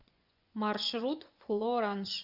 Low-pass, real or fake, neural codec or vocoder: 5.4 kHz; fake; vocoder, 24 kHz, 100 mel bands, Vocos